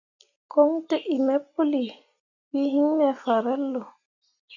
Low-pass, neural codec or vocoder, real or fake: 7.2 kHz; none; real